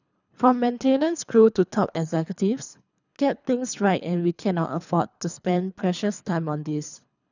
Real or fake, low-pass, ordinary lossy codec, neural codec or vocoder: fake; 7.2 kHz; none; codec, 24 kHz, 3 kbps, HILCodec